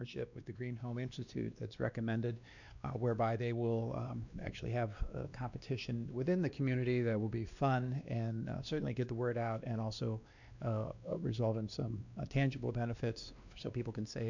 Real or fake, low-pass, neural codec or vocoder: fake; 7.2 kHz; codec, 16 kHz, 2 kbps, X-Codec, WavLM features, trained on Multilingual LibriSpeech